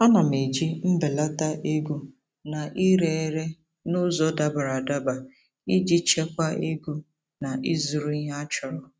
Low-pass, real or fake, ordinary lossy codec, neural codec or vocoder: none; real; none; none